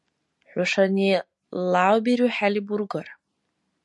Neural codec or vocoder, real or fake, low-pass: none; real; 10.8 kHz